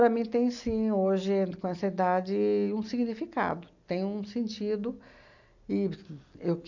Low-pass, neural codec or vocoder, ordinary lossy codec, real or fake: 7.2 kHz; none; none; real